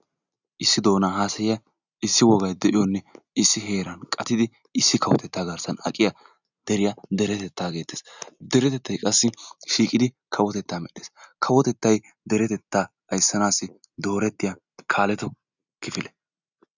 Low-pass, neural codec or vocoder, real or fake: 7.2 kHz; none; real